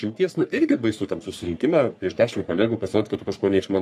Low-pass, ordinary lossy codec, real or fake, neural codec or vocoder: 14.4 kHz; AAC, 96 kbps; fake; codec, 44.1 kHz, 3.4 kbps, Pupu-Codec